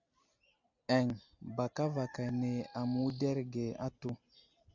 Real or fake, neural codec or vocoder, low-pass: real; none; 7.2 kHz